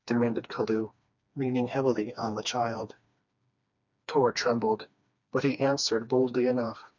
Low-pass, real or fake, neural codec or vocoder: 7.2 kHz; fake; codec, 16 kHz, 2 kbps, FreqCodec, smaller model